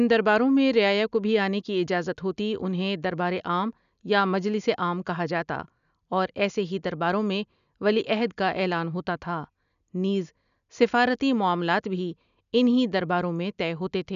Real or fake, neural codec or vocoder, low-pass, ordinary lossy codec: real; none; 7.2 kHz; none